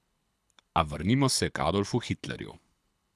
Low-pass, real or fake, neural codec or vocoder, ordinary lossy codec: none; fake; codec, 24 kHz, 6 kbps, HILCodec; none